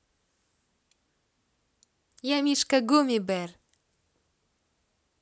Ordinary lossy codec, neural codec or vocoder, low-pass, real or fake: none; none; none; real